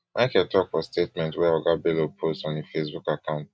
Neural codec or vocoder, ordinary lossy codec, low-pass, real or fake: none; none; none; real